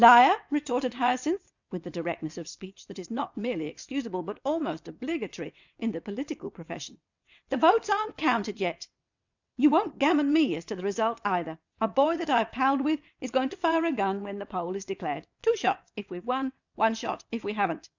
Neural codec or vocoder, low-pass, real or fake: vocoder, 22.05 kHz, 80 mel bands, WaveNeXt; 7.2 kHz; fake